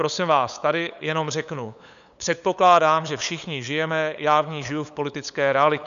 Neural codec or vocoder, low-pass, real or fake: codec, 16 kHz, 8 kbps, FunCodec, trained on LibriTTS, 25 frames a second; 7.2 kHz; fake